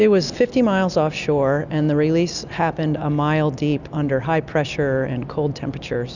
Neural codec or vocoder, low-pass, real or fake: none; 7.2 kHz; real